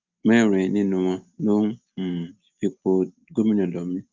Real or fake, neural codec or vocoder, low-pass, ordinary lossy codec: real; none; 7.2 kHz; Opus, 32 kbps